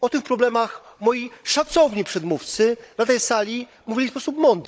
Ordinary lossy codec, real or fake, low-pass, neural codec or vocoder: none; fake; none; codec, 16 kHz, 16 kbps, FunCodec, trained on Chinese and English, 50 frames a second